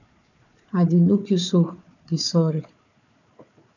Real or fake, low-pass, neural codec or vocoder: fake; 7.2 kHz; codec, 16 kHz, 4 kbps, FunCodec, trained on Chinese and English, 50 frames a second